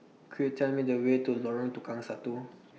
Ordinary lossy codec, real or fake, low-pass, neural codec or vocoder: none; real; none; none